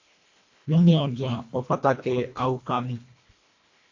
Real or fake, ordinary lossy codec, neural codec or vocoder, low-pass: fake; AAC, 48 kbps; codec, 24 kHz, 1.5 kbps, HILCodec; 7.2 kHz